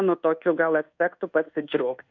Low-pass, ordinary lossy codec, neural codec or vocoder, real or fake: 7.2 kHz; MP3, 64 kbps; codec, 24 kHz, 1.2 kbps, DualCodec; fake